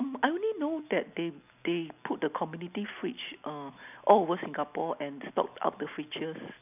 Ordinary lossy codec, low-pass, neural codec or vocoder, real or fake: none; 3.6 kHz; none; real